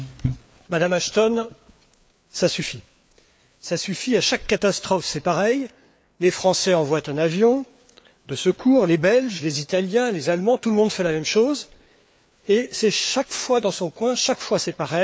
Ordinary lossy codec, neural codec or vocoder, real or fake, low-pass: none; codec, 16 kHz, 4 kbps, FreqCodec, larger model; fake; none